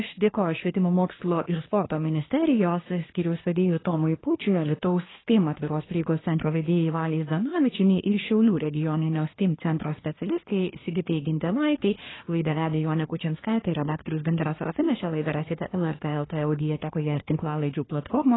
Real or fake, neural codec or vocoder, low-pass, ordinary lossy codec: fake; codec, 24 kHz, 1 kbps, SNAC; 7.2 kHz; AAC, 16 kbps